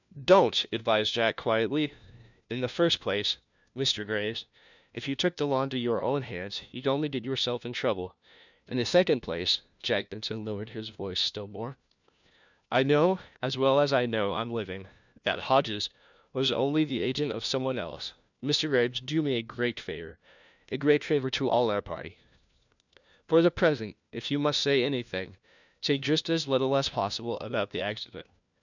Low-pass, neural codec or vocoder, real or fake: 7.2 kHz; codec, 16 kHz, 1 kbps, FunCodec, trained on LibriTTS, 50 frames a second; fake